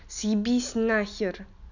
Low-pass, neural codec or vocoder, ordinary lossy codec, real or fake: 7.2 kHz; none; none; real